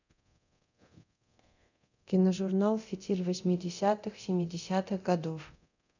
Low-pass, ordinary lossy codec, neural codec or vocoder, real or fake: 7.2 kHz; none; codec, 24 kHz, 0.9 kbps, DualCodec; fake